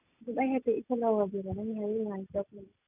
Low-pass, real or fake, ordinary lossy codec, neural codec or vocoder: 3.6 kHz; real; none; none